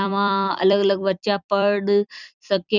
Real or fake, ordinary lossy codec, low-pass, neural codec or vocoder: fake; none; 7.2 kHz; vocoder, 44.1 kHz, 128 mel bands every 256 samples, BigVGAN v2